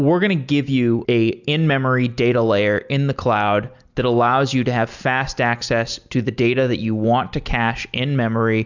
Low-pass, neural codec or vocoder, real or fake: 7.2 kHz; none; real